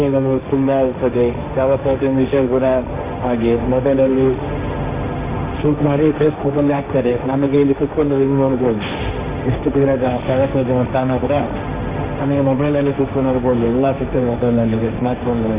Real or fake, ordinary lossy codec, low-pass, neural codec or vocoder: fake; Opus, 64 kbps; 3.6 kHz; codec, 16 kHz, 1.1 kbps, Voila-Tokenizer